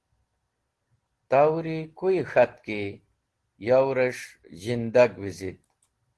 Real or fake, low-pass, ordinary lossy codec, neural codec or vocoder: real; 10.8 kHz; Opus, 16 kbps; none